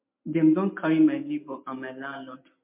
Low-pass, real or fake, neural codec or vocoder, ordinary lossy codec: 3.6 kHz; real; none; MP3, 32 kbps